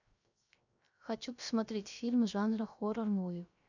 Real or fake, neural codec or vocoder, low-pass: fake; codec, 16 kHz, 0.3 kbps, FocalCodec; 7.2 kHz